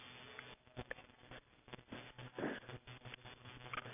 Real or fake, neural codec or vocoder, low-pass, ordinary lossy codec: real; none; 3.6 kHz; none